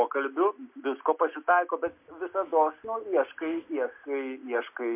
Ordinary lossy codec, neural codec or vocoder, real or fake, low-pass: MP3, 32 kbps; none; real; 3.6 kHz